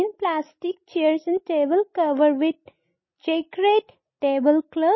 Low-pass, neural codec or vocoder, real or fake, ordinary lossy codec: 7.2 kHz; none; real; MP3, 24 kbps